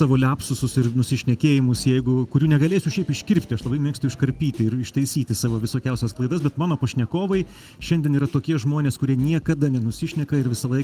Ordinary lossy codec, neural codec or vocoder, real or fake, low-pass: Opus, 24 kbps; none; real; 14.4 kHz